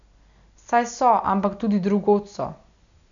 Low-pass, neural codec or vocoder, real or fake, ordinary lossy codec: 7.2 kHz; none; real; none